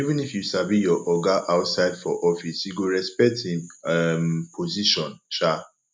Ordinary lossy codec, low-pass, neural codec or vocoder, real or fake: none; none; none; real